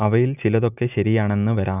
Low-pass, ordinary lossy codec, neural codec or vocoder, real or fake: 3.6 kHz; none; none; real